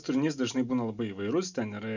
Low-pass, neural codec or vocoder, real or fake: 7.2 kHz; none; real